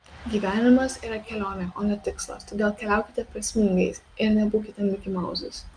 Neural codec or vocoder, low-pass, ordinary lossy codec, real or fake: none; 9.9 kHz; Opus, 32 kbps; real